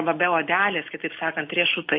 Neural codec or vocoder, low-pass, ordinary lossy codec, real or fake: none; 5.4 kHz; MP3, 32 kbps; real